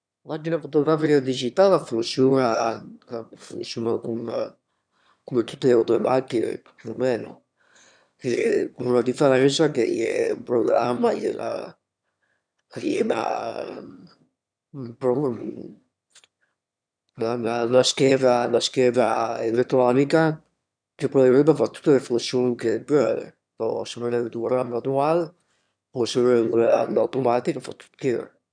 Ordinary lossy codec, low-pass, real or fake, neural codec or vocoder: none; 9.9 kHz; fake; autoencoder, 22.05 kHz, a latent of 192 numbers a frame, VITS, trained on one speaker